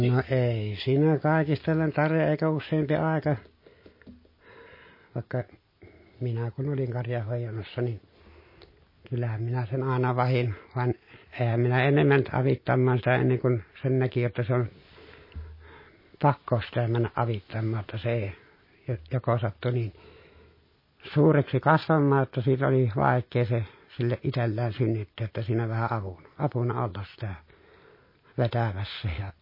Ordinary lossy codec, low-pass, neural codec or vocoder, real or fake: MP3, 24 kbps; 5.4 kHz; none; real